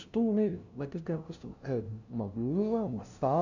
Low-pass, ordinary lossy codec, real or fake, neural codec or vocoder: 7.2 kHz; none; fake; codec, 16 kHz, 0.5 kbps, FunCodec, trained on LibriTTS, 25 frames a second